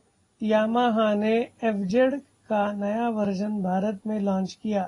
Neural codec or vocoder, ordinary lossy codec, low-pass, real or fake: none; AAC, 32 kbps; 10.8 kHz; real